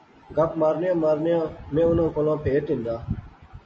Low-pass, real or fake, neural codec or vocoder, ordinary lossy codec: 9.9 kHz; real; none; MP3, 32 kbps